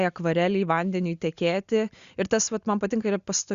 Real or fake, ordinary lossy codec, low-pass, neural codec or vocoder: real; Opus, 64 kbps; 7.2 kHz; none